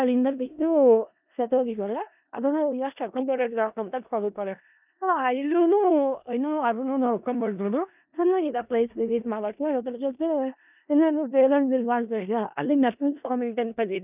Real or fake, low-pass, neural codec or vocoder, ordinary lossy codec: fake; 3.6 kHz; codec, 16 kHz in and 24 kHz out, 0.4 kbps, LongCat-Audio-Codec, four codebook decoder; none